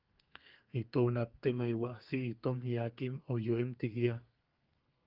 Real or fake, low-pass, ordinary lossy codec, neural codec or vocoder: fake; 5.4 kHz; Opus, 32 kbps; codec, 32 kHz, 1.9 kbps, SNAC